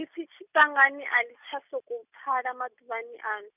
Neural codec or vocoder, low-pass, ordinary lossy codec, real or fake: none; 3.6 kHz; none; real